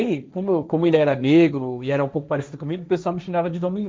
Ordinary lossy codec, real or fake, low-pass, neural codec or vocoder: none; fake; none; codec, 16 kHz, 1.1 kbps, Voila-Tokenizer